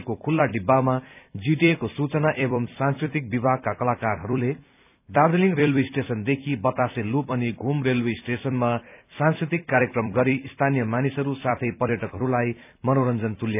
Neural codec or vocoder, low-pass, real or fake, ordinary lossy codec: vocoder, 44.1 kHz, 128 mel bands every 256 samples, BigVGAN v2; 3.6 kHz; fake; none